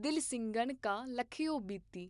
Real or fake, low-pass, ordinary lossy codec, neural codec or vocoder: real; 10.8 kHz; none; none